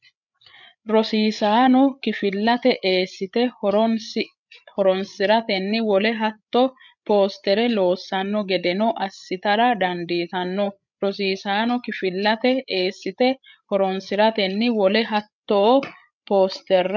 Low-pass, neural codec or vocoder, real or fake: 7.2 kHz; codec, 16 kHz, 8 kbps, FreqCodec, larger model; fake